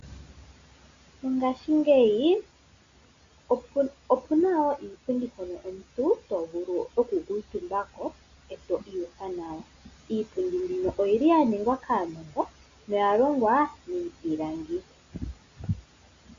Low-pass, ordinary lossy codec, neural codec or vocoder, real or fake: 7.2 kHz; MP3, 64 kbps; none; real